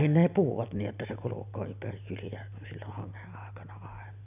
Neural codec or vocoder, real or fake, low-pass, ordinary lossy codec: none; real; 3.6 kHz; none